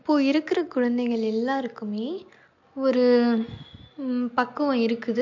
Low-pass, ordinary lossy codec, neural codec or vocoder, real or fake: 7.2 kHz; MP3, 48 kbps; none; real